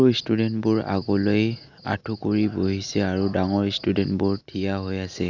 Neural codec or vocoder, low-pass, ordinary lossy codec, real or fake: none; 7.2 kHz; none; real